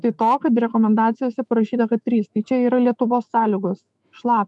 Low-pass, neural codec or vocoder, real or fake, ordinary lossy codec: 10.8 kHz; autoencoder, 48 kHz, 128 numbers a frame, DAC-VAE, trained on Japanese speech; fake; MP3, 96 kbps